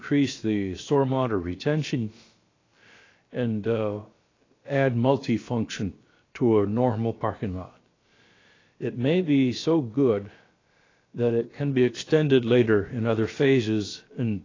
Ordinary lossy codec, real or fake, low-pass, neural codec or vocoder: AAC, 32 kbps; fake; 7.2 kHz; codec, 16 kHz, about 1 kbps, DyCAST, with the encoder's durations